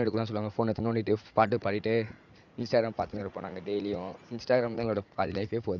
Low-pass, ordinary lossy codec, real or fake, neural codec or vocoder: 7.2 kHz; none; fake; vocoder, 44.1 kHz, 80 mel bands, Vocos